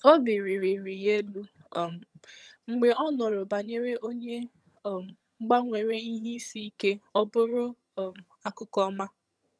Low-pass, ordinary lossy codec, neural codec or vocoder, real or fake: none; none; vocoder, 22.05 kHz, 80 mel bands, HiFi-GAN; fake